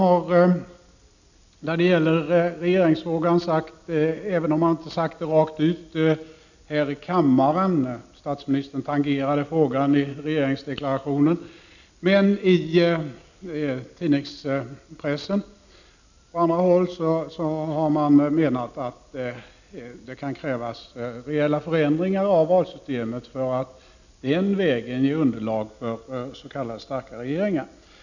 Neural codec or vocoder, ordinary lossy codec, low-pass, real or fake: none; none; 7.2 kHz; real